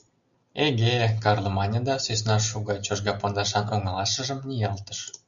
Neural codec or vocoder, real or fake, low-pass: none; real; 7.2 kHz